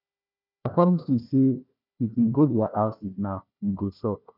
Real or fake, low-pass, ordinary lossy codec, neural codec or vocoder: fake; 5.4 kHz; none; codec, 16 kHz, 1 kbps, FunCodec, trained on Chinese and English, 50 frames a second